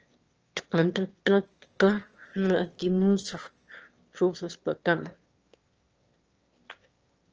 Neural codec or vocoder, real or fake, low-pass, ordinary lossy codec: autoencoder, 22.05 kHz, a latent of 192 numbers a frame, VITS, trained on one speaker; fake; 7.2 kHz; Opus, 24 kbps